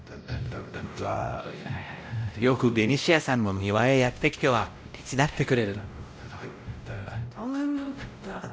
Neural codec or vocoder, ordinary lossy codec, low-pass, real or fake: codec, 16 kHz, 0.5 kbps, X-Codec, WavLM features, trained on Multilingual LibriSpeech; none; none; fake